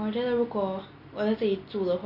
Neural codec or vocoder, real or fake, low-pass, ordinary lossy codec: none; real; 5.4 kHz; none